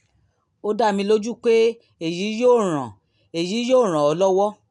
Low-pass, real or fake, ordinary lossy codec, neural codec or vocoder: 10.8 kHz; real; none; none